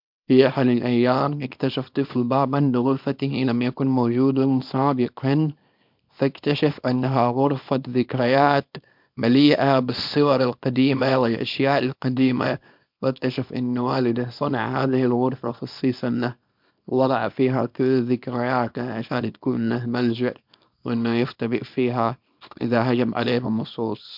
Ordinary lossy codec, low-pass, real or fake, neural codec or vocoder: MP3, 48 kbps; 5.4 kHz; fake; codec, 24 kHz, 0.9 kbps, WavTokenizer, small release